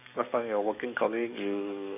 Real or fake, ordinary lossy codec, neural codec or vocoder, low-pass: fake; none; codec, 44.1 kHz, 7.8 kbps, DAC; 3.6 kHz